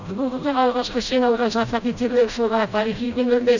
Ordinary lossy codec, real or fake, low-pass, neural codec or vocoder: none; fake; 7.2 kHz; codec, 16 kHz, 0.5 kbps, FreqCodec, smaller model